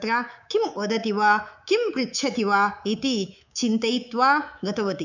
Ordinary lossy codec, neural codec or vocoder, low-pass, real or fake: none; codec, 24 kHz, 3.1 kbps, DualCodec; 7.2 kHz; fake